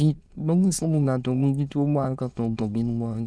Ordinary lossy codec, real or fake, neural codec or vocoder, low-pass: none; fake; autoencoder, 22.05 kHz, a latent of 192 numbers a frame, VITS, trained on many speakers; none